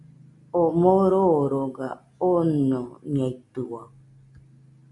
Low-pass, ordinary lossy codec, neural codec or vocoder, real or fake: 10.8 kHz; MP3, 64 kbps; none; real